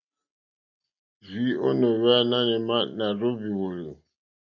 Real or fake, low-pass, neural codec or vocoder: real; 7.2 kHz; none